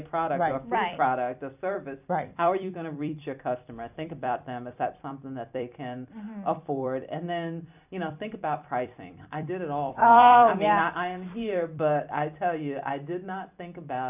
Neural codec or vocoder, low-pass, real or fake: vocoder, 44.1 kHz, 128 mel bands every 256 samples, BigVGAN v2; 3.6 kHz; fake